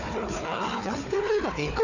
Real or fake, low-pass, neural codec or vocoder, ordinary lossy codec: fake; 7.2 kHz; codec, 16 kHz, 4 kbps, FunCodec, trained on Chinese and English, 50 frames a second; none